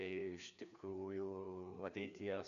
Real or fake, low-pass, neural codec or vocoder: fake; 7.2 kHz; codec, 16 kHz, 2 kbps, FreqCodec, larger model